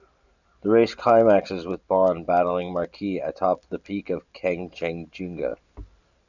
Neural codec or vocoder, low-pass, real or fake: none; 7.2 kHz; real